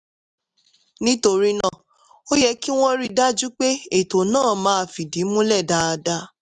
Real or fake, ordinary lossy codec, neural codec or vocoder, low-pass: real; none; none; 10.8 kHz